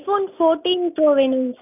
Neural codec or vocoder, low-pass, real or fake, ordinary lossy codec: none; 3.6 kHz; real; none